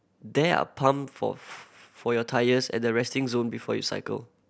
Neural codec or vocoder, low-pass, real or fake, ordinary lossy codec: none; none; real; none